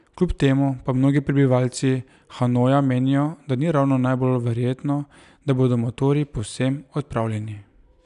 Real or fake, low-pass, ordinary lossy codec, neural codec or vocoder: real; 10.8 kHz; none; none